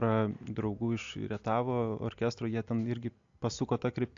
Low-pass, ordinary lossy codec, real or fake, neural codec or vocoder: 7.2 kHz; AAC, 64 kbps; real; none